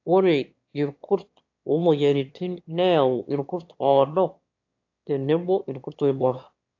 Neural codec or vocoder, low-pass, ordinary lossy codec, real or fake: autoencoder, 22.05 kHz, a latent of 192 numbers a frame, VITS, trained on one speaker; 7.2 kHz; AAC, 48 kbps; fake